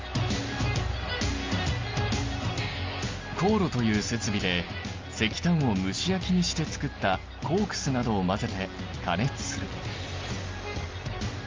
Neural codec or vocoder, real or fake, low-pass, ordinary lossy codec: none; real; 7.2 kHz; Opus, 32 kbps